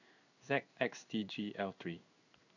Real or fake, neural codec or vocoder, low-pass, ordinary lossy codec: fake; codec, 16 kHz in and 24 kHz out, 1 kbps, XY-Tokenizer; 7.2 kHz; none